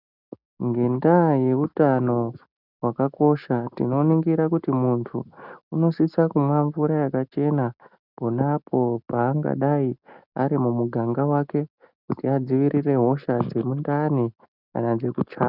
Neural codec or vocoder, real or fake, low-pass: none; real; 5.4 kHz